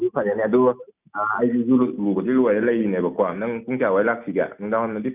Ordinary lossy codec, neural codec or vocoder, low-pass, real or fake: none; none; 3.6 kHz; real